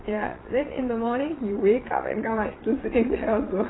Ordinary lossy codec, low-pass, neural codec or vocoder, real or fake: AAC, 16 kbps; 7.2 kHz; vocoder, 22.05 kHz, 80 mel bands, Vocos; fake